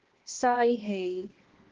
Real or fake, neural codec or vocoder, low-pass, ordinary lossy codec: fake; codec, 16 kHz, 1 kbps, X-Codec, HuBERT features, trained on balanced general audio; 7.2 kHz; Opus, 16 kbps